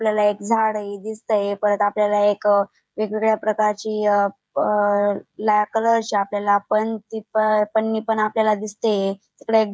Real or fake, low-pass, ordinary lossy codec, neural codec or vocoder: fake; none; none; codec, 16 kHz, 16 kbps, FreqCodec, smaller model